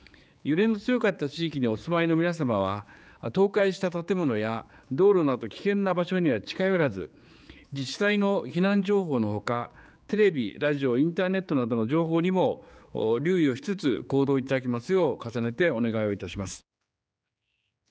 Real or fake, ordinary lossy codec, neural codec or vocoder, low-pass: fake; none; codec, 16 kHz, 4 kbps, X-Codec, HuBERT features, trained on general audio; none